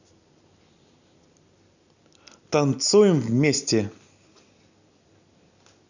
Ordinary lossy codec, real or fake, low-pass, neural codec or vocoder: none; real; 7.2 kHz; none